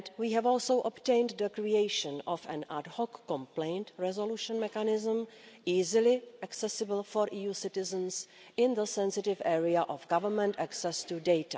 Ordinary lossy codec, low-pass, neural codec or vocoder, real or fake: none; none; none; real